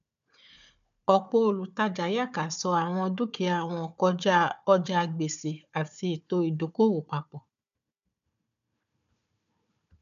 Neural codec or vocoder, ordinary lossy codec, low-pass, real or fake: codec, 16 kHz, 4 kbps, FunCodec, trained on Chinese and English, 50 frames a second; none; 7.2 kHz; fake